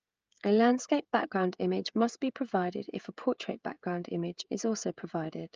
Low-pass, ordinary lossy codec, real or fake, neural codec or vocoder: 7.2 kHz; Opus, 24 kbps; fake; codec, 16 kHz, 16 kbps, FreqCodec, smaller model